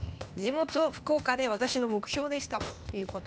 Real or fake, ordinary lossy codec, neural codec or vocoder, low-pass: fake; none; codec, 16 kHz, 0.8 kbps, ZipCodec; none